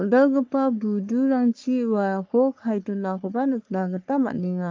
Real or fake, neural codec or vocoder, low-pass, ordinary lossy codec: fake; codec, 44.1 kHz, 3.4 kbps, Pupu-Codec; 7.2 kHz; Opus, 24 kbps